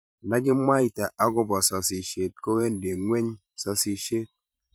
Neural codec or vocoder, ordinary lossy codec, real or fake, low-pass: vocoder, 44.1 kHz, 128 mel bands every 512 samples, BigVGAN v2; none; fake; none